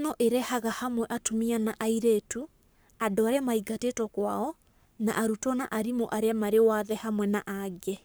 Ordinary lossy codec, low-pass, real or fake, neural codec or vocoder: none; none; fake; codec, 44.1 kHz, 7.8 kbps, Pupu-Codec